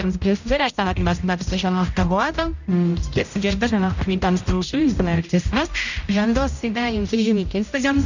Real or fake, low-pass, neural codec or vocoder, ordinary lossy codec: fake; 7.2 kHz; codec, 16 kHz, 0.5 kbps, X-Codec, HuBERT features, trained on general audio; none